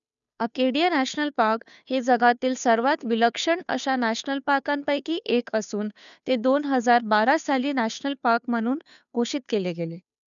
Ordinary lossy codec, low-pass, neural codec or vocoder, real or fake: none; 7.2 kHz; codec, 16 kHz, 2 kbps, FunCodec, trained on Chinese and English, 25 frames a second; fake